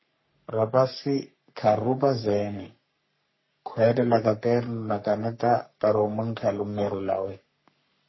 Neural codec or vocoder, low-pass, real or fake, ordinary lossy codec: codec, 44.1 kHz, 3.4 kbps, Pupu-Codec; 7.2 kHz; fake; MP3, 24 kbps